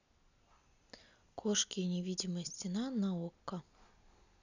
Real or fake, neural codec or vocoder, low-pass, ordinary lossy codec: real; none; 7.2 kHz; none